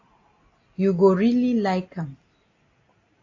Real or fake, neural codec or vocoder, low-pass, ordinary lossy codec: real; none; 7.2 kHz; AAC, 32 kbps